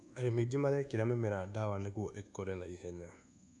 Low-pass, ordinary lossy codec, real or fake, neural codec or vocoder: none; none; fake; codec, 24 kHz, 1.2 kbps, DualCodec